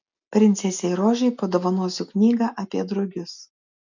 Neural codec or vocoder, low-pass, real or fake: none; 7.2 kHz; real